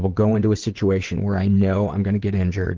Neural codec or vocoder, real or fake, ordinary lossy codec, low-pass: vocoder, 22.05 kHz, 80 mel bands, WaveNeXt; fake; Opus, 16 kbps; 7.2 kHz